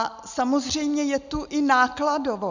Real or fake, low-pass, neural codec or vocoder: real; 7.2 kHz; none